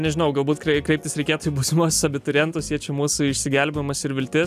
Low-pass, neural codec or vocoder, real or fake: 14.4 kHz; none; real